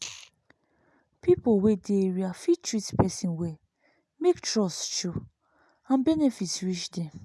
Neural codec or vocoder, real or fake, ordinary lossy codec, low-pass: none; real; none; none